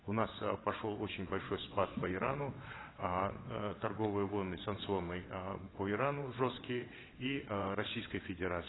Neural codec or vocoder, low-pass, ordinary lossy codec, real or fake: vocoder, 22.05 kHz, 80 mel bands, WaveNeXt; 7.2 kHz; AAC, 16 kbps; fake